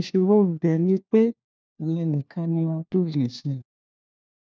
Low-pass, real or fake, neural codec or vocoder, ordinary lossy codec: none; fake; codec, 16 kHz, 1 kbps, FunCodec, trained on LibriTTS, 50 frames a second; none